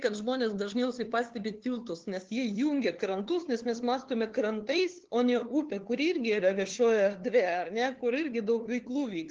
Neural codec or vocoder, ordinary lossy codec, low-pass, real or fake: codec, 16 kHz, 2 kbps, FunCodec, trained on LibriTTS, 25 frames a second; Opus, 16 kbps; 7.2 kHz; fake